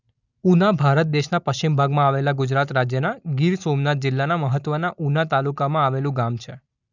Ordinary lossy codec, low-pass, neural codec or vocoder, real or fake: none; 7.2 kHz; none; real